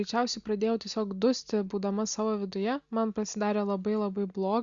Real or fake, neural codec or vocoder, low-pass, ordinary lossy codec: real; none; 7.2 kHz; Opus, 64 kbps